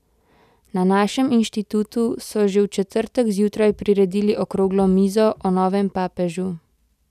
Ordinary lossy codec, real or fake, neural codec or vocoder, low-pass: none; real; none; 14.4 kHz